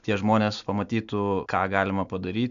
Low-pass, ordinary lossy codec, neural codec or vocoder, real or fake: 7.2 kHz; MP3, 96 kbps; none; real